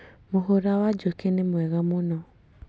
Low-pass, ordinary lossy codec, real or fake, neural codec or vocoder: none; none; real; none